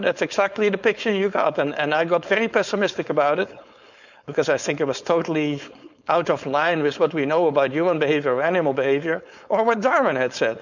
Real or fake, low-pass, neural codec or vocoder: fake; 7.2 kHz; codec, 16 kHz, 4.8 kbps, FACodec